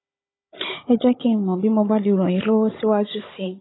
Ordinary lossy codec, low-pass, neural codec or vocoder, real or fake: AAC, 16 kbps; 7.2 kHz; codec, 16 kHz, 16 kbps, FunCodec, trained on Chinese and English, 50 frames a second; fake